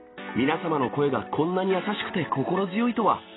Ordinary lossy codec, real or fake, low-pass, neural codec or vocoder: AAC, 16 kbps; real; 7.2 kHz; none